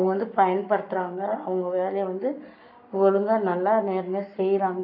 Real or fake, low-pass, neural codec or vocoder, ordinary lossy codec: fake; 5.4 kHz; codec, 44.1 kHz, 7.8 kbps, Pupu-Codec; none